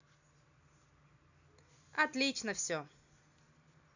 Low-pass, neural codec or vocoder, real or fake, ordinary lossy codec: 7.2 kHz; none; real; none